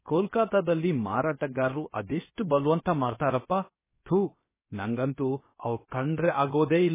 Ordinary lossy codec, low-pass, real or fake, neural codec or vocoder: MP3, 16 kbps; 3.6 kHz; fake; codec, 16 kHz, about 1 kbps, DyCAST, with the encoder's durations